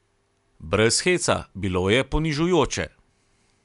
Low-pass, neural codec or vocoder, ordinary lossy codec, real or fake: 10.8 kHz; none; none; real